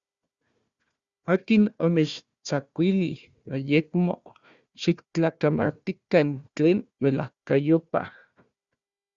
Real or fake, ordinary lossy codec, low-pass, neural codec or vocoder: fake; Opus, 64 kbps; 7.2 kHz; codec, 16 kHz, 1 kbps, FunCodec, trained on Chinese and English, 50 frames a second